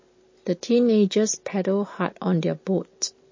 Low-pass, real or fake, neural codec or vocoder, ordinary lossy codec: 7.2 kHz; real; none; MP3, 32 kbps